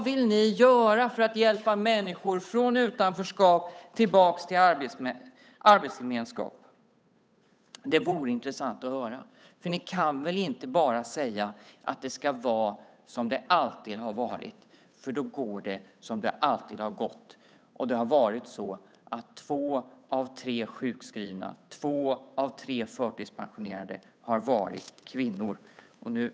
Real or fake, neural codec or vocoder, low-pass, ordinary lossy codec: fake; codec, 16 kHz, 8 kbps, FunCodec, trained on Chinese and English, 25 frames a second; none; none